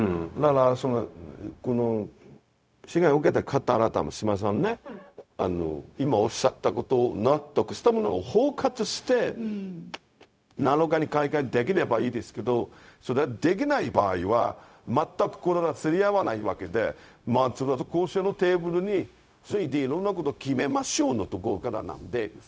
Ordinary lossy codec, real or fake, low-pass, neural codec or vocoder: none; fake; none; codec, 16 kHz, 0.4 kbps, LongCat-Audio-Codec